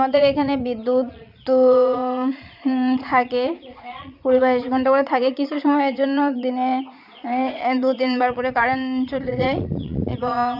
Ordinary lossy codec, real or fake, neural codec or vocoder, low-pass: none; fake; vocoder, 22.05 kHz, 80 mel bands, Vocos; 5.4 kHz